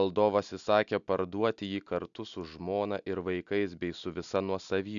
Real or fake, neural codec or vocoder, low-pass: real; none; 7.2 kHz